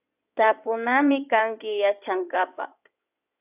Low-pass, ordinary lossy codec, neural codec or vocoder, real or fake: 3.6 kHz; AAC, 32 kbps; codec, 16 kHz in and 24 kHz out, 2.2 kbps, FireRedTTS-2 codec; fake